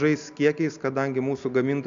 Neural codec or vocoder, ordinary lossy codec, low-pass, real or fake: none; AAC, 96 kbps; 7.2 kHz; real